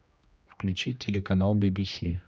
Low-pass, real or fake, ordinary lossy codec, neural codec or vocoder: none; fake; none; codec, 16 kHz, 1 kbps, X-Codec, HuBERT features, trained on general audio